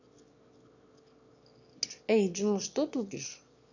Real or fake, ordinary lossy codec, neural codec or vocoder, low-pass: fake; none; autoencoder, 22.05 kHz, a latent of 192 numbers a frame, VITS, trained on one speaker; 7.2 kHz